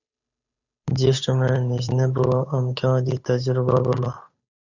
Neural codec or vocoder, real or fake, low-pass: codec, 16 kHz, 8 kbps, FunCodec, trained on Chinese and English, 25 frames a second; fake; 7.2 kHz